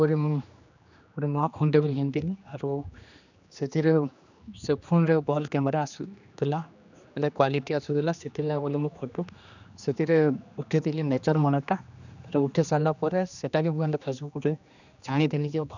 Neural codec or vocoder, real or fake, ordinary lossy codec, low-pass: codec, 16 kHz, 2 kbps, X-Codec, HuBERT features, trained on general audio; fake; none; 7.2 kHz